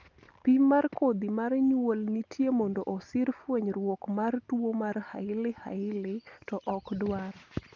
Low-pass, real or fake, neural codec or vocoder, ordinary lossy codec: 7.2 kHz; real; none; Opus, 32 kbps